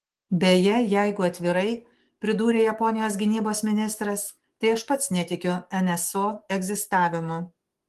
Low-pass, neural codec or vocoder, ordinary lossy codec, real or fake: 14.4 kHz; codec, 44.1 kHz, 7.8 kbps, DAC; Opus, 24 kbps; fake